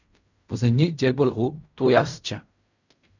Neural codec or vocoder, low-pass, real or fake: codec, 16 kHz in and 24 kHz out, 0.4 kbps, LongCat-Audio-Codec, fine tuned four codebook decoder; 7.2 kHz; fake